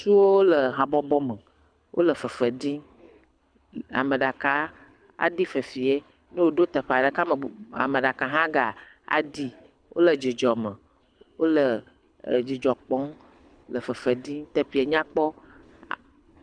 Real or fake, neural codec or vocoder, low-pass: fake; codec, 24 kHz, 6 kbps, HILCodec; 9.9 kHz